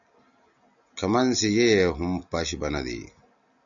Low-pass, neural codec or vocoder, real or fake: 7.2 kHz; none; real